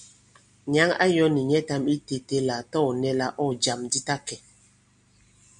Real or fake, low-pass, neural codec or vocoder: real; 9.9 kHz; none